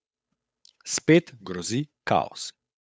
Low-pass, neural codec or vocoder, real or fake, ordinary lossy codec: none; codec, 16 kHz, 8 kbps, FunCodec, trained on Chinese and English, 25 frames a second; fake; none